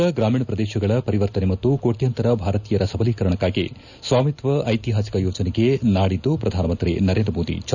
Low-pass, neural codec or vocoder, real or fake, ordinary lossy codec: 7.2 kHz; none; real; none